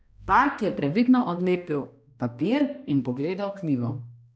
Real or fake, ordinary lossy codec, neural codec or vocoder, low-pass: fake; none; codec, 16 kHz, 1 kbps, X-Codec, HuBERT features, trained on balanced general audio; none